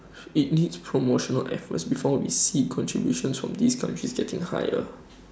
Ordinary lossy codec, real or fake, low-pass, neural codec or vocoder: none; real; none; none